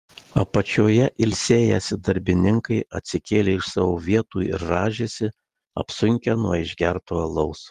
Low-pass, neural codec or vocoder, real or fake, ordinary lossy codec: 14.4 kHz; none; real; Opus, 24 kbps